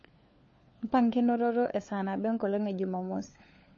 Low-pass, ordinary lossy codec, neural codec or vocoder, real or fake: 7.2 kHz; MP3, 32 kbps; codec, 16 kHz, 4 kbps, FunCodec, trained on LibriTTS, 50 frames a second; fake